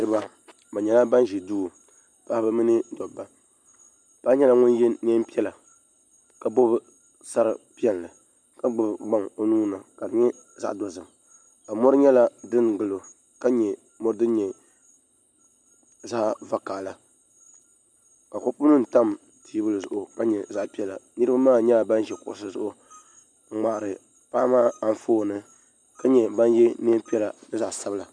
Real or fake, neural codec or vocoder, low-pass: real; none; 9.9 kHz